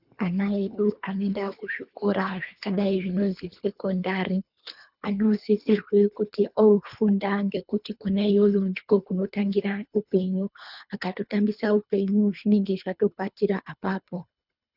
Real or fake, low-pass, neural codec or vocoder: fake; 5.4 kHz; codec, 24 kHz, 3 kbps, HILCodec